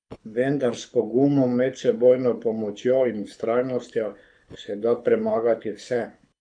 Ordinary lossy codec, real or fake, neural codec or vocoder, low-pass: MP3, 96 kbps; fake; codec, 24 kHz, 6 kbps, HILCodec; 9.9 kHz